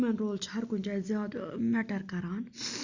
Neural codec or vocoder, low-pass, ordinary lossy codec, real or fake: none; 7.2 kHz; Opus, 64 kbps; real